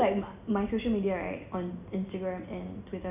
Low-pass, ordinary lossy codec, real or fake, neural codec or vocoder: 3.6 kHz; none; real; none